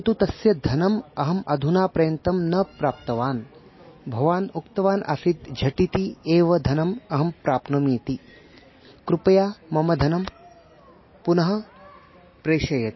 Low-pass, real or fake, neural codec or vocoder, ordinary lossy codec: 7.2 kHz; real; none; MP3, 24 kbps